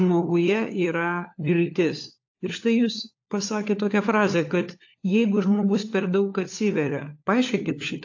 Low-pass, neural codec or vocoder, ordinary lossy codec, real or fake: 7.2 kHz; codec, 16 kHz, 4 kbps, FunCodec, trained on LibriTTS, 50 frames a second; AAC, 48 kbps; fake